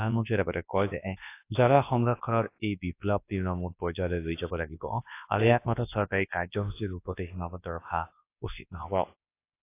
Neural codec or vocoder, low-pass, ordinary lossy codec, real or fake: codec, 24 kHz, 0.9 kbps, WavTokenizer, large speech release; 3.6 kHz; AAC, 24 kbps; fake